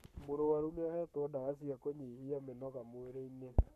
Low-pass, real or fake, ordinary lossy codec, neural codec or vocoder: 14.4 kHz; fake; none; codec, 44.1 kHz, 7.8 kbps, Pupu-Codec